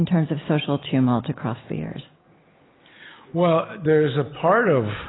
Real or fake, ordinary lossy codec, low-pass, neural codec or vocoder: real; AAC, 16 kbps; 7.2 kHz; none